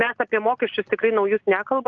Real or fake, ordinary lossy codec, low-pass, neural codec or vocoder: real; Opus, 32 kbps; 7.2 kHz; none